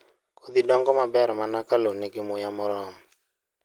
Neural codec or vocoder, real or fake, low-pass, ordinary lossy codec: none; real; 19.8 kHz; Opus, 24 kbps